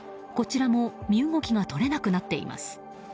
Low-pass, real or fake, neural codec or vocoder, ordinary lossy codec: none; real; none; none